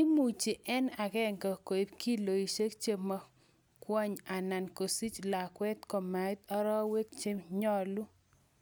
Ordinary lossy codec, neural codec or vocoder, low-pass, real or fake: none; none; none; real